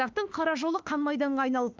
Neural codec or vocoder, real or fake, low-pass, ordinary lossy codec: autoencoder, 48 kHz, 32 numbers a frame, DAC-VAE, trained on Japanese speech; fake; 7.2 kHz; Opus, 32 kbps